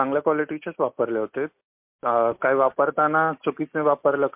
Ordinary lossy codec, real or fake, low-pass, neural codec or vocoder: MP3, 32 kbps; real; 3.6 kHz; none